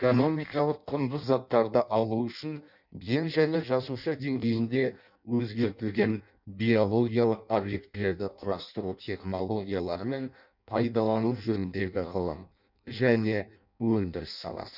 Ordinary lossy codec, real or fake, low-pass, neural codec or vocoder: none; fake; 5.4 kHz; codec, 16 kHz in and 24 kHz out, 0.6 kbps, FireRedTTS-2 codec